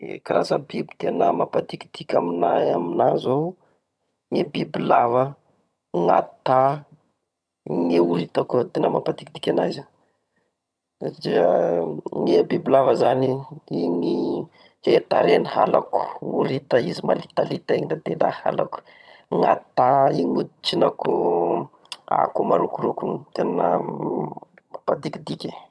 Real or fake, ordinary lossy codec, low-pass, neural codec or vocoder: fake; none; none; vocoder, 22.05 kHz, 80 mel bands, HiFi-GAN